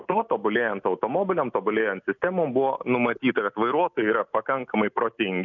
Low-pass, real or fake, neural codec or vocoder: 7.2 kHz; real; none